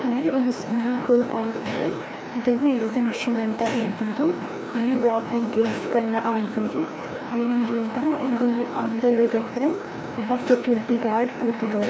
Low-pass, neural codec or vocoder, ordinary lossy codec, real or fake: none; codec, 16 kHz, 1 kbps, FreqCodec, larger model; none; fake